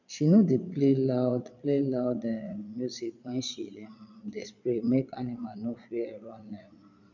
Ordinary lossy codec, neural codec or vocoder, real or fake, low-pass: none; vocoder, 22.05 kHz, 80 mel bands, Vocos; fake; 7.2 kHz